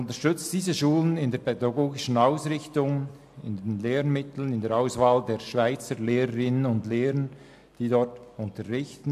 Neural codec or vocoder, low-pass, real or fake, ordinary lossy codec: none; 14.4 kHz; real; AAC, 96 kbps